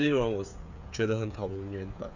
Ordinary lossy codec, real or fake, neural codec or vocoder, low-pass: none; fake; codec, 16 kHz, 8 kbps, FreqCodec, smaller model; 7.2 kHz